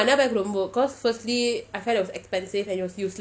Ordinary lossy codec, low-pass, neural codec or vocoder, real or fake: none; none; none; real